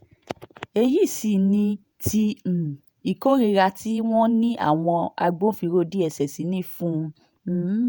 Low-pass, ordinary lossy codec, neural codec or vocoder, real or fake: none; none; vocoder, 48 kHz, 128 mel bands, Vocos; fake